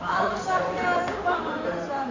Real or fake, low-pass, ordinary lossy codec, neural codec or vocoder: fake; 7.2 kHz; AAC, 48 kbps; codec, 16 kHz in and 24 kHz out, 2.2 kbps, FireRedTTS-2 codec